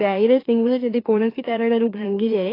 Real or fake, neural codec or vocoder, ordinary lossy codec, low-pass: fake; autoencoder, 44.1 kHz, a latent of 192 numbers a frame, MeloTTS; AAC, 24 kbps; 5.4 kHz